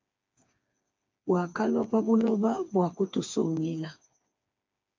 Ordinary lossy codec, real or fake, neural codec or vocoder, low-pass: MP3, 64 kbps; fake; codec, 16 kHz, 4 kbps, FreqCodec, smaller model; 7.2 kHz